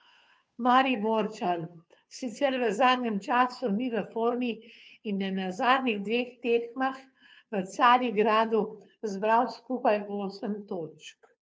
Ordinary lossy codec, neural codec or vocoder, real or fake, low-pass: none; codec, 16 kHz, 2 kbps, FunCodec, trained on Chinese and English, 25 frames a second; fake; none